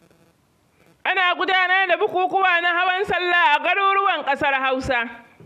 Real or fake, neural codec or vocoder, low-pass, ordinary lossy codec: real; none; 14.4 kHz; AAC, 96 kbps